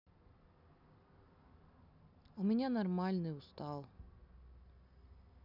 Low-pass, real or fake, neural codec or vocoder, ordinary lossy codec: 5.4 kHz; real; none; none